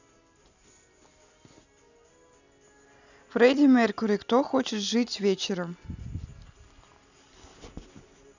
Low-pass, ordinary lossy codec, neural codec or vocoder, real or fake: 7.2 kHz; none; none; real